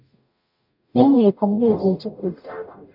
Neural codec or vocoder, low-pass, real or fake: codec, 44.1 kHz, 0.9 kbps, DAC; 5.4 kHz; fake